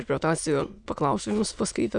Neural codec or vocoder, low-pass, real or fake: autoencoder, 22.05 kHz, a latent of 192 numbers a frame, VITS, trained on many speakers; 9.9 kHz; fake